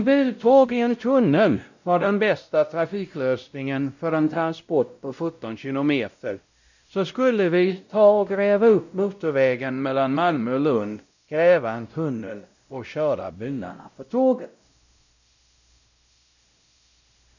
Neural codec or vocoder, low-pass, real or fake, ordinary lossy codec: codec, 16 kHz, 0.5 kbps, X-Codec, WavLM features, trained on Multilingual LibriSpeech; 7.2 kHz; fake; none